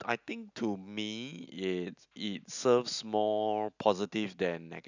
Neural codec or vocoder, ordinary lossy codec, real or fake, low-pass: none; none; real; 7.2 kHz